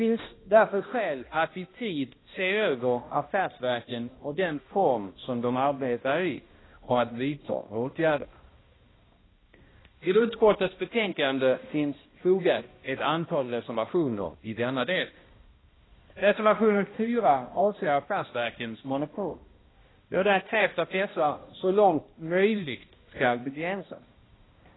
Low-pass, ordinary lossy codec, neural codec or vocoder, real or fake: 7.2 kHz; AAC, 16 kbps; codec, 16 kHz, 1 kbps, X-Codec, HuBERT features, trained on balanced general audio; fake